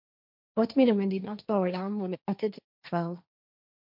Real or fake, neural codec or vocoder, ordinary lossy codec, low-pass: fake; codec, 16 kHz, 1.1 kbps, Voila-Tokenizer; MP3, 48 kbps; 5.4 kHz